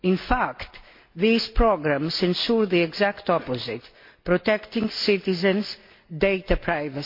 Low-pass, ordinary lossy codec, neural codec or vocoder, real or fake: 5.4 kHz; MP3, 32 kbps; none; real